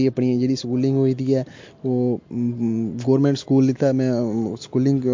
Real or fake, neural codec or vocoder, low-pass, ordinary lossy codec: real; none; 7.2 kHz; MP3, 48 kbps